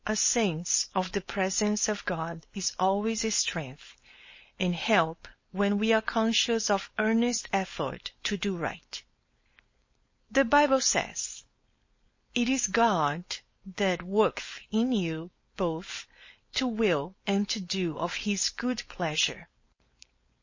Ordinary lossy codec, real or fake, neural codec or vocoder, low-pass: MP3, 32 kbps; fake; codec, 16 kHz, 4.8 kbps, FACodec; 7.2 kHz